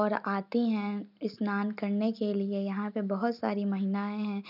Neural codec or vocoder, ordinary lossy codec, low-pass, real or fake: none; none; 5.4 kHz; real